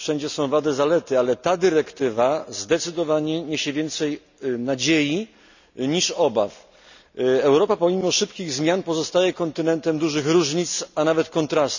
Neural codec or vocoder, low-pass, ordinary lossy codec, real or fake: none; 7.2 kHz; none; real